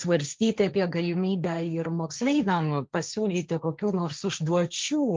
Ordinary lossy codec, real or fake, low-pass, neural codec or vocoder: Opus, 24 kbps; fake; 7.2 kHz; codec, 16 kHz, 1.1 kbps, Voila-Tokenizer